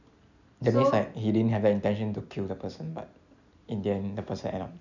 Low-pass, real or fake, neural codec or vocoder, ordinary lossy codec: 7.2 kHz; real; none; none